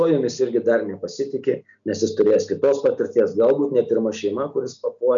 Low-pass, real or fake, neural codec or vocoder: 7.2 kHz; real; none